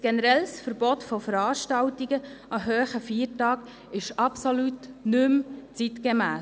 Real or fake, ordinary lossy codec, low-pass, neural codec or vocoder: real; none; none; none